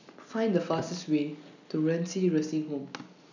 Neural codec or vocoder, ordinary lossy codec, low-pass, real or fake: none; none; 7.2 kHz; real